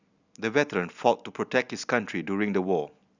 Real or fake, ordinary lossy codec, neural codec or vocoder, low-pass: real; none; none; 7.2 kHz